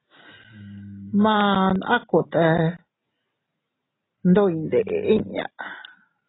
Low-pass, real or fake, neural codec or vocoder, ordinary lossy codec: 7.2 kHz; real; none; AAC, 16 kbps